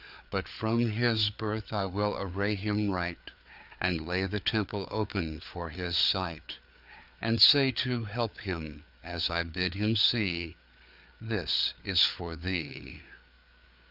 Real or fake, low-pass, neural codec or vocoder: fake; 5.4 kHz; codec, 16 kHz, 4 kbps, FreqCodec, larger model